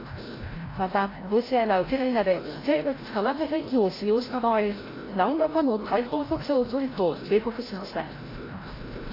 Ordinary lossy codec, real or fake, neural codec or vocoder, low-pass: AAC, 24 kbps; fake; codec, 16 kHz, 0.5 kbps, FreqCodec, larger model; 5.4 kHz